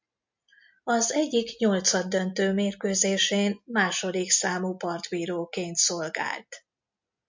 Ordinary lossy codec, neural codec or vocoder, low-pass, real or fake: MP3, 64 kbps; none; 7.2 kHz; real